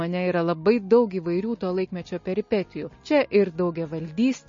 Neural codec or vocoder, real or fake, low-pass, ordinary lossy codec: none; real; 7.2 kHz; MP3, 32 kbps